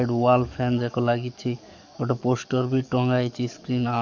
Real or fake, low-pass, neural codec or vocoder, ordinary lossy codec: fake; 7.2 kHz; codec, 44.1 kHz, 7.8 kbps, DAC; none